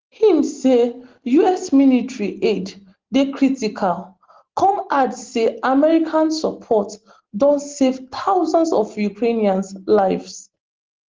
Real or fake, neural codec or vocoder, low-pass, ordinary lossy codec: real; none; 7.2 kHz; Opus, 16 kbps